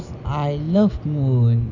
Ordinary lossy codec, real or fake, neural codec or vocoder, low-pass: none; real; none; 7.2 kHz